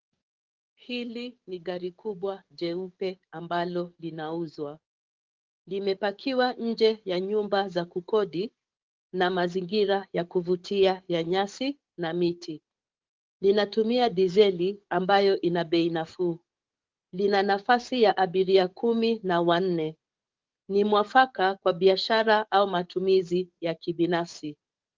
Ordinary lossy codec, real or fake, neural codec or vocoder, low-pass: Opus, 32 kbps; fake; codec, 44.1 kHz, 7.8 kbps, DAC; 7.2 kHz